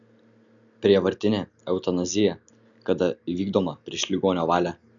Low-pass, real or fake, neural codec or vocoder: 7.2 kHz; real; none